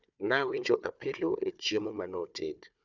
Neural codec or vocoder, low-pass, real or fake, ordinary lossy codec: codec, 16 kHz, 4 kbps, FunCodec, trained on Chinese and English, 50 frames a second; 7.2 kHz; fake; none